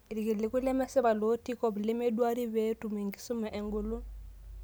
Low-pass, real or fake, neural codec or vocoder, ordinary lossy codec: none; real; none; none